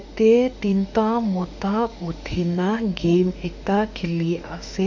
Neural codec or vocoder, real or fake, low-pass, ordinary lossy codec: autoencoder, 48 kHz, 32 numbers a frame, DAC-VAE, trained on Japanese speech; fake; 7.2 kHz; none